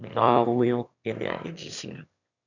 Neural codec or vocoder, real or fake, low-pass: autoencoder, 22.05 kHz, a latent of 192 numbers a frame, VITS, trained on one speaker; fake; 7.2 kHz